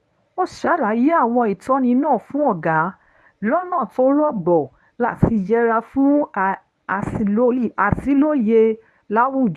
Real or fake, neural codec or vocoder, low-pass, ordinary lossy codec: fake; codec, 24 kHz, 0.9 kbps, WavTokenizer, medium speech release version 1; none; none